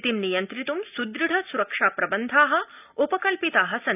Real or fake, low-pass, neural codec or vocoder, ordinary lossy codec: real; 3.6 kHz; none; none